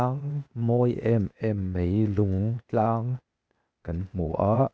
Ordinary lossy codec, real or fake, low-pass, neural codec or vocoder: none; fake; none; codec, 16 kHz, 0.8 kbps, ZipCodec